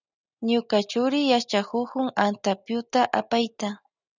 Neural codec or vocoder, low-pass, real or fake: none; 7.2 kHz; real